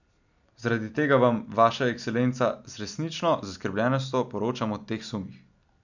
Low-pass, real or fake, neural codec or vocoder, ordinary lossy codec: 7.2 kHz; real; none; none